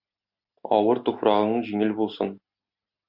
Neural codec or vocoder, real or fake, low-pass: none; real; 5.4 kHz